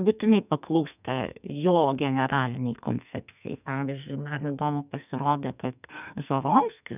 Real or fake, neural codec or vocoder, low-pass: fake; codec, 44.1 kHz, 2.6 kbps, SNAC; 3.6 kHz